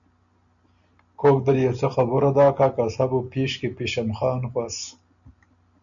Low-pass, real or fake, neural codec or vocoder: 7.2 kHz; real; none